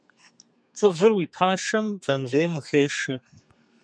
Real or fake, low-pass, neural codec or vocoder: fake; 9.9 kHz; codec, 32 kHz, 1.9 kbps, SNAC